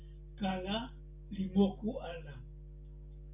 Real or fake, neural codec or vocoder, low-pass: real; none; 3.6 kHz